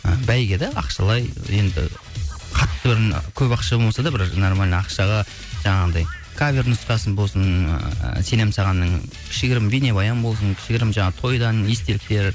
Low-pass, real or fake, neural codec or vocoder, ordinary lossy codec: none; real; none; none